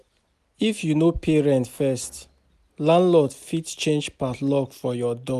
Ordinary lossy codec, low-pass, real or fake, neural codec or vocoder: none; 14.4 kHz; real; none